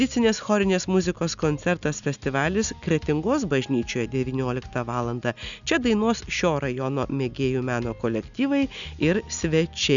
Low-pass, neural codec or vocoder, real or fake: 7.2 kHz; none; real